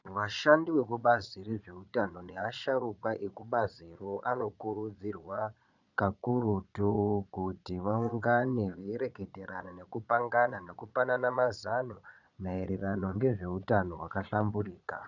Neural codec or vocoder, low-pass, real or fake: vocoder, 22.05 kHz, 80 mel bands, Vocos; 7.2 kHz; fake